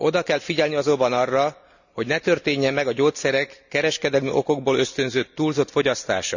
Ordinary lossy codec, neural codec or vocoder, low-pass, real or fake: none; none; 7.2 kHz; real